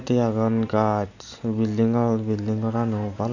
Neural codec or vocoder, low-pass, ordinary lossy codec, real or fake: none; 7.2 kHz; none; real